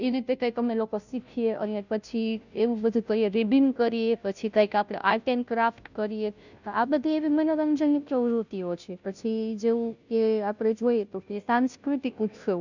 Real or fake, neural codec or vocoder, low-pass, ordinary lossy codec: fake; codec, 16 kHz, 0.5 kbps, FunCodec, trained on Chinese and English, 25 frames a second; 7.2 kHz; none